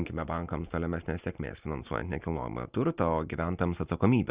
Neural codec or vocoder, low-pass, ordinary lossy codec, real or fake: none; 3.6 kHz; Opus, 64 kbps; real